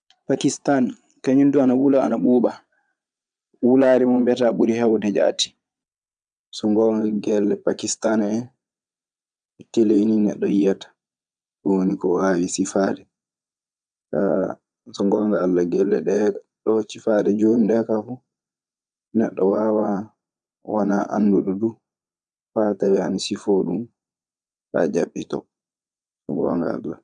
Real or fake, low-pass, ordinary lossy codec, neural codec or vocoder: fake; 9.9 kHz; none; vocoder, 22.05 kHz, 80 mel bands, Vocos